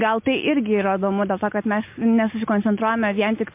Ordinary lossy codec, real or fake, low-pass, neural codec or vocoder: MP3, 32 kbps; real; 3.6 kHz; none